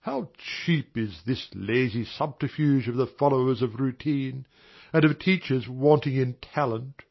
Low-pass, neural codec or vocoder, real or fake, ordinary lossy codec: 7.2 kHz; none; real; MP3, 24 kbps